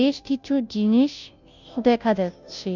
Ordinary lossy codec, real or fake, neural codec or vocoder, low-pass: none; fake; codec, 16 kHz, 0.5 kbps, FunCodec, trained on Chinese and English, 25 frames a second; 7.2 kHz